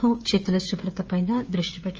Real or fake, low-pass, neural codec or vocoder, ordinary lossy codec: fake; 7.2 kHz; codec, 16 kHz, 16 kbps, FreqCodec, smaller model; Opus, 24 kbps